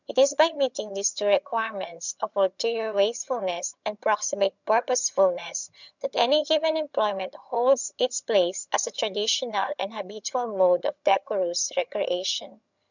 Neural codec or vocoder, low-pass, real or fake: vocoder, 22.05 kHz, 80 mel bands, HiFi-GAN; 7.2 kHz; fake